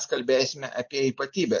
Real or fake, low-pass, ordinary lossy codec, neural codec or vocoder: real; 7.2 kHz; MP3, 48 kbps; none